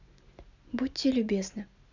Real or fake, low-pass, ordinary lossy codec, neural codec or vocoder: real; 7.2 kHz; none; none